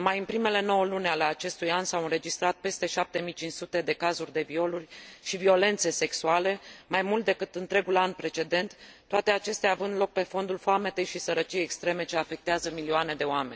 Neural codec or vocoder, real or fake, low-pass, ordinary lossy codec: none; real; none; none